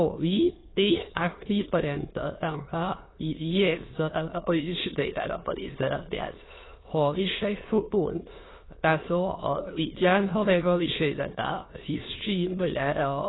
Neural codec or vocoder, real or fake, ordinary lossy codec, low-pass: autoencoder, 22.05 kHz, a latent of 192 numbers a frame, VITS, trained on many speakers; fake; AAC, 16 kbps; 7.2 kHz